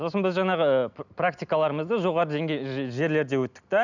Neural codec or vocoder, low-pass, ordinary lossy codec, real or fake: none; 7.2 kHz; none; real